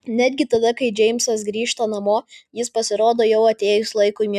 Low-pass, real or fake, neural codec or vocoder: 14.4 kHz; real; none